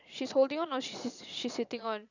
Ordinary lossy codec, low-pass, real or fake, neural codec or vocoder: none; 7.2 kHz; fake; vocoder, 22.05 kHz, 80 mel bands, Vocos